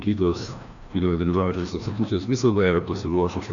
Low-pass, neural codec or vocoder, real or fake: 7.2 kHz; codec, 16 kHz, 1 kbps, FreqCodec, larger model; fake